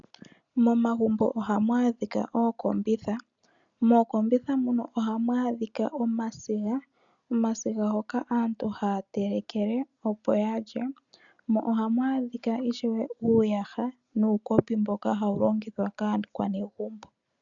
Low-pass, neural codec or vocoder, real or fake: 7.2 kHz; none; real